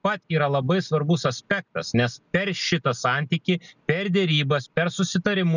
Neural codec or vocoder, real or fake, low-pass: none; real; 7.2 kHz